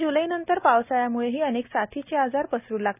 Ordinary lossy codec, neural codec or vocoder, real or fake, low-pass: none; none; real; 3.6 kHz